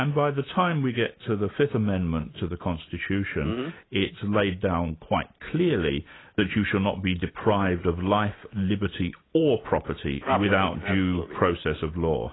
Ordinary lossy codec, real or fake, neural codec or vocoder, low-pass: AAC, 16 kbps; real; none; 7.2 kHz